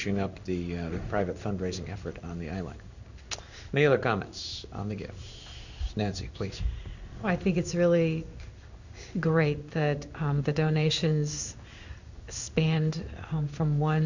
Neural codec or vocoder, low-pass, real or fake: codec, 16 kHz in and 24 kHz out, 1 kbps, XY-Tokenizer; 7.2 kHz; fake